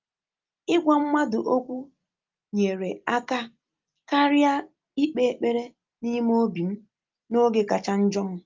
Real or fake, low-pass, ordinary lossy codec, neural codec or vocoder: real; 7.2 kHz; Opus, 32 kbps; none